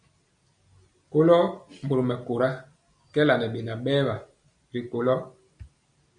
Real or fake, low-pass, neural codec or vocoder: real; 9.9 kHz; none